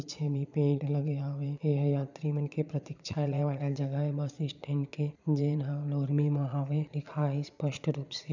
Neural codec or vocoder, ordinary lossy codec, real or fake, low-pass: vocoder, 22.05 kHz, 80 mel bands, Vocos; none; fake; 7.2 kHz